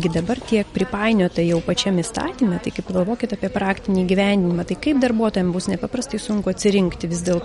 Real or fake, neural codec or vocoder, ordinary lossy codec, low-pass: real; none; MP3, 48 kbps; 19.8 kHz